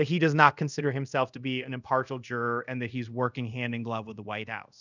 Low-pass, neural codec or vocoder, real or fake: 7.2 kHz; codec, 24 kHz, 0.5 kbps, DualCodec; fake